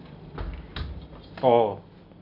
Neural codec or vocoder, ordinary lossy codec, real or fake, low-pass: none; none; real; 5.4 kHz